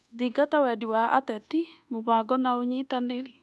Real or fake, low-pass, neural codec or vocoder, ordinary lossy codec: fake; none; codec, 24 kHz, 1.2 kbps, DualCodec; none